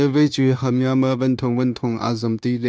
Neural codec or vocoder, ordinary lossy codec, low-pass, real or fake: codec, 16 kHz, 0.9 kbps, LongCat-Audio-Codec; none; none; fake